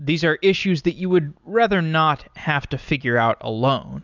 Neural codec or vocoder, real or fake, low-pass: none; real; 7.2 kHz